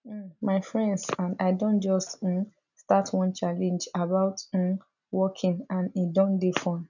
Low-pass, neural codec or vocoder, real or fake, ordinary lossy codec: 7.2 kHz; none; real; none